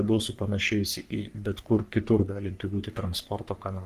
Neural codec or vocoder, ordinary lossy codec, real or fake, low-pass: codec, 44.1 kHz, 3.4 kbps, Pupu-Codec; Opus, 24 kbps; fake; 14.4 kHz